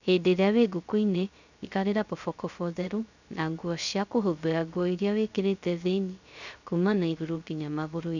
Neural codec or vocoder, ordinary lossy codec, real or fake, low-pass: codec, 16 kHz, 0.3 kbps, FocalCodec; none; fake; 7.2 kHz